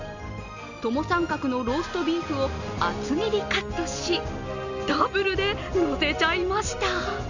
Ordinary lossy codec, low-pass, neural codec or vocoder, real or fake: none; 7.2 kHz; none; real